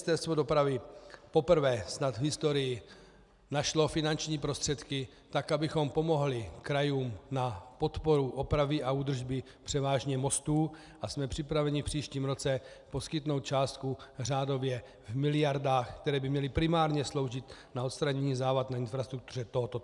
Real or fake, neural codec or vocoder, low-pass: real; none; 10.8 kHz